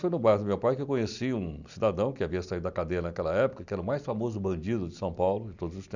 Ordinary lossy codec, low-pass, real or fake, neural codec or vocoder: none; 7.2 kHz; real; none